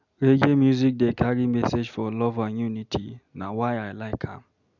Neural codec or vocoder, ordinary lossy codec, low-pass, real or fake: none; none; 7.2 kHz; real